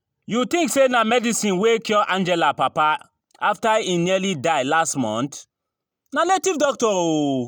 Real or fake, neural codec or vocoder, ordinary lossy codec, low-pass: real; none; none; none